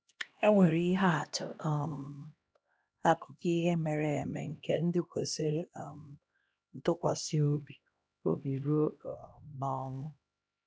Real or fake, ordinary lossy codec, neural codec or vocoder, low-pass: fake; none; codec, 16 kHz, 1 kbps, X-Codec, HuBERT features, trained on LibriSpeech; none